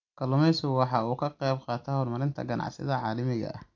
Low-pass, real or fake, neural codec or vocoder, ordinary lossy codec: 7.2 kHz; real; none; AAC, 48 kbps